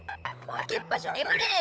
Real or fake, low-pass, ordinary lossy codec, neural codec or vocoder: fake; none; none; codec, 16 kHz, 16 kbps, FunCodec, trained on LibriTTS, 50 frames a second